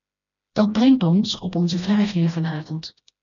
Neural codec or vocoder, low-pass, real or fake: codec, 16 kHz, 1 kbps, FreqCodec, smaller model; 7.2 kHz; fake